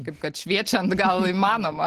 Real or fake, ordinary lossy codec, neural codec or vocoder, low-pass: real; Opus, 16 kbps; none; 14.4 kHz